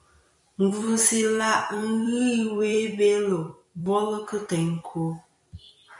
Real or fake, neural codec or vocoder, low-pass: fake; vocoder, 44.1 kHz, 128 mel bands every 256 samples, BigVGAN v2; 10.8 kHz